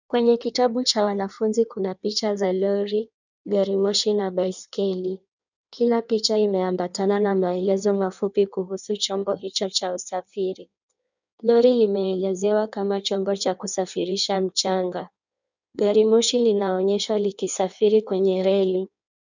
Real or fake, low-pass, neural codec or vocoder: fake; 7.2 kHz; codec, 16 kHz in and 24 kHz out, 1.1 kbps, FireRedTTS-2 codec